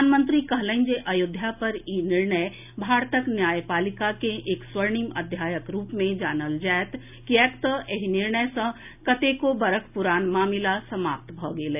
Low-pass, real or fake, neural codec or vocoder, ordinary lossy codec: 3.6 kHz; real; none; none